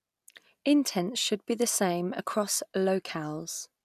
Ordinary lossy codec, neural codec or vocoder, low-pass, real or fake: none; none; 14.4 kHz; real